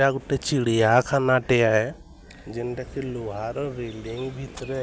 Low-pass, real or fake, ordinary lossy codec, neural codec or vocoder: none; real; none; none